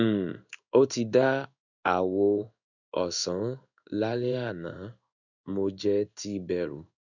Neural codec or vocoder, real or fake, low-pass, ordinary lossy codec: codec, 16 kHz in and 24 kHz out, 1 kbps, XY-Tokenizer; fake; 7.2 kHz; none